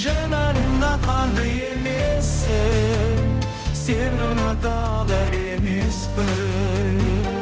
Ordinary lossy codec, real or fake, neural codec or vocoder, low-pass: none; fake; codec, 16 kHz, 0.5 kbps, X-Codec, HuBERT features, trained on balanced general audio; none